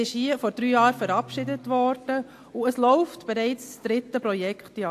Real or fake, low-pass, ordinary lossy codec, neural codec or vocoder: real; 14.4 kHz; AAC, 64 kbps; none